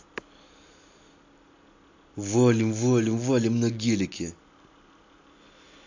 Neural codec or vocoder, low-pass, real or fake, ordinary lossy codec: none; 7.2 kHz; real; AAC, 48 kbps